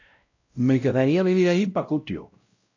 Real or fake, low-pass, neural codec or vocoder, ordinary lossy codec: fake; 7.2 kHz; codec, 16 kHz, 0.5 kbps, X-Codec, WavLM features, trained on Multilingual LibriSpeech; AAC, 48 kbps